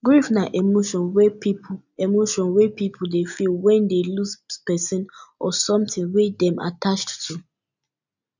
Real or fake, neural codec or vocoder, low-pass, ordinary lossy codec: real; none; 7.2 kHz; none